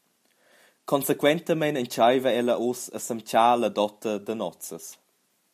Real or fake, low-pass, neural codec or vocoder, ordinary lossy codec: real; 14.4 kHz; none; MP3, 96 kbps